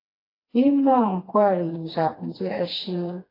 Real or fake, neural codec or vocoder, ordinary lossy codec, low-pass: fake; codec, 16 kHz, 2 kbps, FreqCodec, smaller model; AAC, 24 kbps; 5.4 kHz